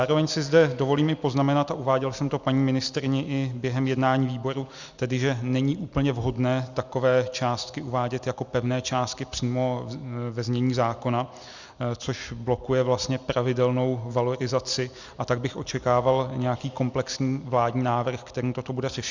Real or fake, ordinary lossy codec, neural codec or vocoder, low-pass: real; Opus, 64 kbps; none; 7.2 kHz